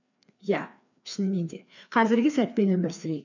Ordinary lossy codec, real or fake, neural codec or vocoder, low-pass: none; fake; codec, 16 kHz, 2 kbps, FreqCodec, larger model; 7.2 kHz